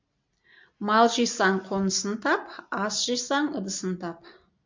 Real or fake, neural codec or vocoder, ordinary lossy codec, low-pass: real; none; MP3, 64 kbps; 7.2 kHz